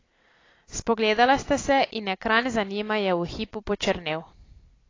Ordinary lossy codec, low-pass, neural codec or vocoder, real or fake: AAC, 32 kbps; 7.2 kHz; none; real